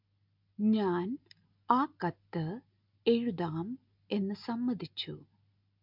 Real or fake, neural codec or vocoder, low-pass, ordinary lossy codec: real; none; 5.4 kHz; MP3, 48 kbps